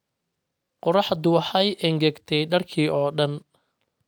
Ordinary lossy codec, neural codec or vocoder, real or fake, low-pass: none; none; real; none